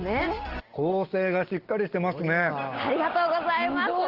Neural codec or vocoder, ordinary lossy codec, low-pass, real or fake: none; Opus, 16 kbps; 5.4 kHz; real